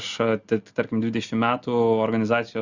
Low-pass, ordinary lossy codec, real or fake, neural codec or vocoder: 7.2 kHz; Opus, 64 kbps; real; none